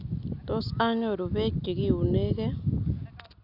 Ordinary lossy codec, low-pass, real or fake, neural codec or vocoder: none; 5.4 kHz; real; none